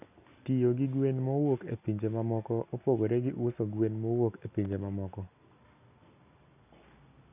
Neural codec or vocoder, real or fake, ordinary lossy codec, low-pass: none; real; none; 3.6 kHz